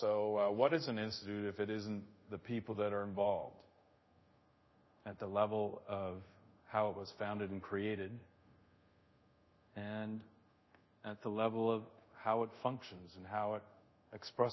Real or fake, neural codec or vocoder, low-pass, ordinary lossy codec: fake; codec, 24 kHz, 0.5 kbps, DualCodec; 7.2 kHz; MP3, 24 kbps